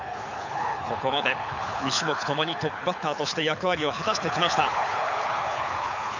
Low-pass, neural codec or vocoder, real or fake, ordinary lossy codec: 7.2 kHz; codec, 24 kHz, 6 kbps, HILCodec; fake; none